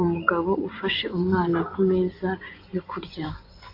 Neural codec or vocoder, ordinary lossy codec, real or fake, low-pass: vocoder, 24 kHz, 100 mel bands, Vocos; AAC, 32 kbps; fake; 5.4 kHz